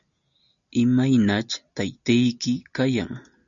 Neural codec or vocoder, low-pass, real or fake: none; 7.2 kHz; real